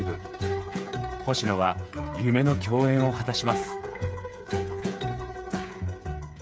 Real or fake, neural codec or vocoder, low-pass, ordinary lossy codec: fake; codec, 16 kHz, 8 kbps, FreqCodec, smaller model; none; none